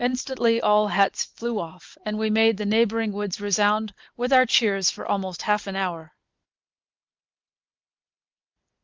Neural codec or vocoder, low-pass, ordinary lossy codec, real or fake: none; 7.2 kHz; Opus, 24 kbps; real